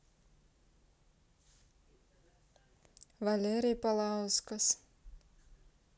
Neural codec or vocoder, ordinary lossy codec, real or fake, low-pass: none; none; real; none